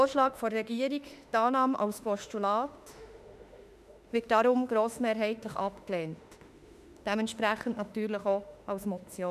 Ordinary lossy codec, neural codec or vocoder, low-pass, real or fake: none; autoencoder, 48 kHz, 32 numbers a frame, DAC-VAE, trained on Japanese speech; 14.4 kHz; fake